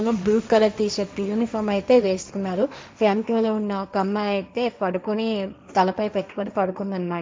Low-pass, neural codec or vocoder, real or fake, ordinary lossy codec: none; codec, 16 kHz, 1.1 kbps, Voila-Tokenizer; fake; none